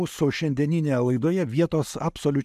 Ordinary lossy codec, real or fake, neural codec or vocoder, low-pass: AAC, 96 kbps; real; none; 14.4 kHz